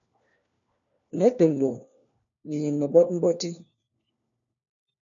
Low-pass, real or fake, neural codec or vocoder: 7.2 kHz; fake; codec, 16 kHz, 1 kbps, FunCodec, trained on LibriTTS, 50 frames a second